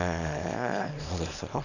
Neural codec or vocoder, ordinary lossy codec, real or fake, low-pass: codec, 24 kHz, 0.9 kbps, WavTokenizer, small release; none; fake; 7.2 kHz